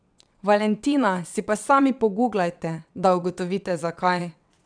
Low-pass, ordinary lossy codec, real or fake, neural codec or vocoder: 9.9 kHz; none; fake; vocoder, 22.05 kHz, 80 mel bands, WaveNeXt